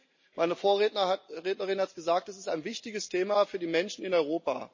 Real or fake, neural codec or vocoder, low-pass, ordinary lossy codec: real; none; 7.2 kHz; MP3, 32 kbps